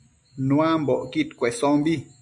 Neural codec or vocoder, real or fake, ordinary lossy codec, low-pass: none; real; AAC, 64 kbps; 10.8 kHz